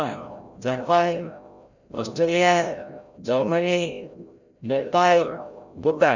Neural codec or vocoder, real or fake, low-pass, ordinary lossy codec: codec, 16 kHz, 0.5 kbps, FreqCodec, larger model; fake; 7.2 kHz; none